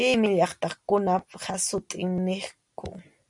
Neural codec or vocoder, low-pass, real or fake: none; 10.8 kHz; real